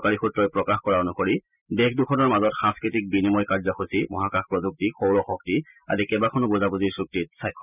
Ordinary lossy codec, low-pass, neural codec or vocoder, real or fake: none; 3.6 kHz; none; real